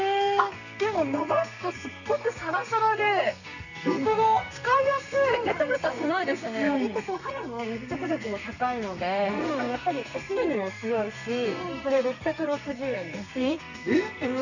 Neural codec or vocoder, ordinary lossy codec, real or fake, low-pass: codec, 32 kHz, 1.9 kbps, SNAC; none; fake; 7.2 kHz